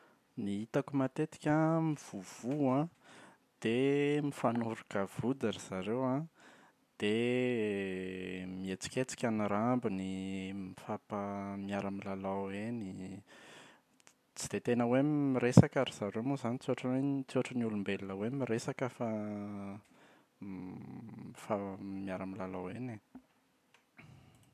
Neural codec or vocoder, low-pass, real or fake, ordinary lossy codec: none; 14.4 kHz; real; AAC, 96 kbps